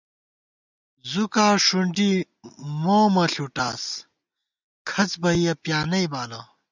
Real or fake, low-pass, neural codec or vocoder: real; 7.2 kHz; none